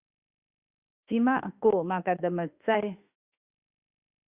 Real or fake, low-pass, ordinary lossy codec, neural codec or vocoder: fake; 3.6 kHz; Opus, 64 kbps; autoencoder, 48 kHz, 32 numbers a frame, DAC-VAE, trained on Japanese speech